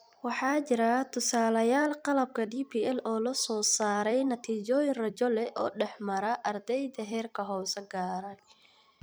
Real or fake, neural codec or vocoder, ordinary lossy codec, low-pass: fake; vocoder, 44.1 kHz, 128 mel bands every 256 samples, BigVGAN v2; none; none